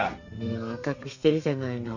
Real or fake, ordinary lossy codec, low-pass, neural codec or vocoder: fake; none; 7.2 kHz; codec, 32 kHz, 1.9 kbps, SNAC